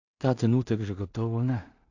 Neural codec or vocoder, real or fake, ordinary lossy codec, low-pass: codec, 16 kHz in and 24 kHz out, 0.4 kbps, LongCat-Audio-Codec, two codebook decoder; fake; AAC, 48 kbps; 7.2 kHz